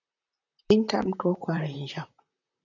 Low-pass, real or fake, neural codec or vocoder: 7.2 kHz; fake; vocoder, 44.1 kHz, 128 mel bands, Pupu-Vocoder